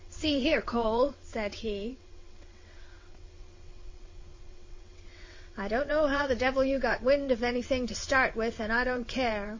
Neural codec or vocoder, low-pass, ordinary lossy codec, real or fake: vocoder, 22.05 kHz, 80 mel bands, WaveNeXt; 7.2 kHz; MP3, 32 kbps; fake